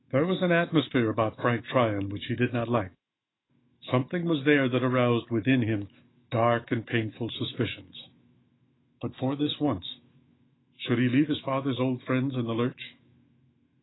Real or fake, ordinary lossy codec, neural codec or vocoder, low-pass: fake; AAC, 16 kbps; autoencoder, 48 kHz, 128 numbers a frame, DAC-VAE, trained on Japanese speech; 7.2 kHz